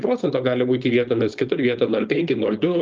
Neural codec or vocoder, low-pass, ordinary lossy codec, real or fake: codec, 16 kHz, 4.8 kbps, FACodec; 7.2 kHz; Opus, 24 kbps; fake